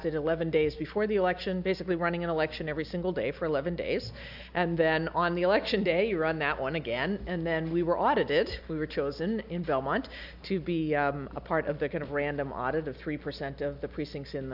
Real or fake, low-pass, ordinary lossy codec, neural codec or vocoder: real; 5.4 kHz; AAC, 48 kbps; none